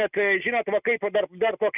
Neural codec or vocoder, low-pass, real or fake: none; 3.6 kHz; real